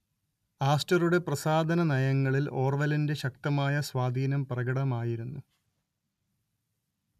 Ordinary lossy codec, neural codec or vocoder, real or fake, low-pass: MP3, 96 kbps; none; real; 14.4 kHz